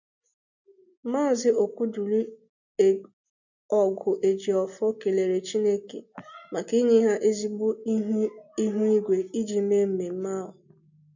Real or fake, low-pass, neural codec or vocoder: real; 7.2 kHz; none